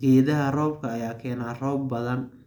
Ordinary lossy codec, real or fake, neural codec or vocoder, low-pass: MP3, 96 kbps; real; none; 19.8 kHz